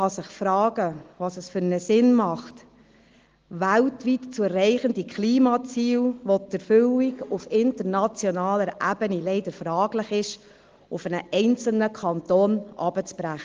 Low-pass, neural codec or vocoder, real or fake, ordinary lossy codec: 7.2 kHz; none; real; Opus, 16 kbps